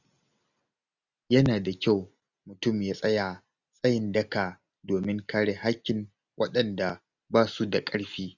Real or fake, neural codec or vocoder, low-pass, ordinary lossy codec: real; none; 7.2 kHz; none